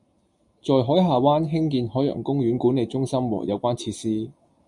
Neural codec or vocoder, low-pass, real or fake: none; 10.8 kHz; real